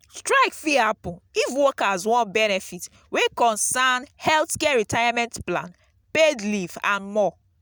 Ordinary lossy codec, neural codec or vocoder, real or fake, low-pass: none; none; real; none